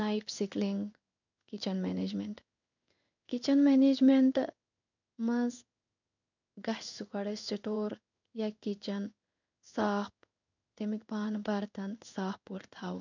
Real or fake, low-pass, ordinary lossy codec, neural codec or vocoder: fake; 7.2 kHz; none; codec, 16 kHz in and 24 kHz out, 1 kbps, XY-Tokenizer